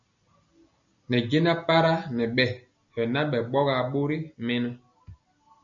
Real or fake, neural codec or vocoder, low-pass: real; none; 7.2 kHz